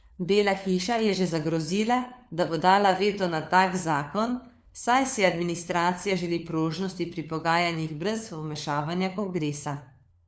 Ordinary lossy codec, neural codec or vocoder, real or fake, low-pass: none; codec, 16 kHz, 4 kbps, FunCodec, trained on LibriTTS, 50 frames a second; fake; none